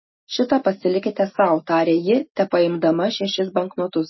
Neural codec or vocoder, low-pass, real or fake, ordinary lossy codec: none; 7.2 kHz; real; MP3, 24 kbps